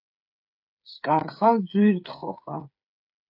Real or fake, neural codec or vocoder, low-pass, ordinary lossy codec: fake; codec, 16 kHz, 8 kbps, FreqCodec, smaller model; 5.4 kHz; AAC, 32 kbps